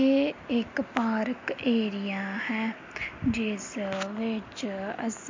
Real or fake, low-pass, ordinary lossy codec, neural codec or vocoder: real; 7.2 kHz; MP3, 48 kbps; none